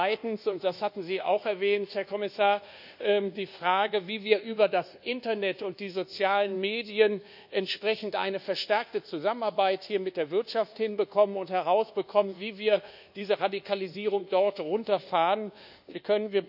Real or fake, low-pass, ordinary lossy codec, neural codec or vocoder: fake; 5.4 kHz; none; codec, 24 kHz, 1.2 kbps, DualCodec